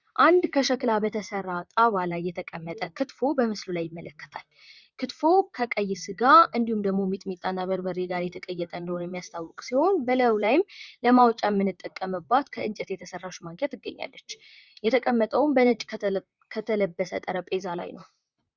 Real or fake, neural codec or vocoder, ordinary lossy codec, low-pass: fake; vocoder, 44.1 kHz, 128 mel bands, Pupu-Vocoder; Opus, 64 kbps; 7.2 kHz